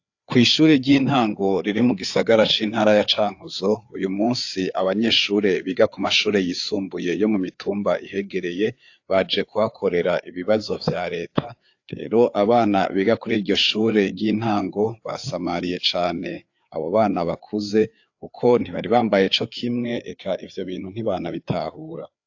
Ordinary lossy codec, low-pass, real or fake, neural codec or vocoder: AAC, 48 kbps; 7.2 kHz; fake; codec, 16 kHz, 4 kbps, FreqCodec, larger model